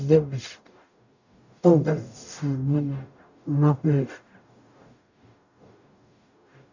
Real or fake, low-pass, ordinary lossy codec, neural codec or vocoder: fake; 7.2 kHz; none; codec, 44.1 kHz, 0.9 kbps, DAC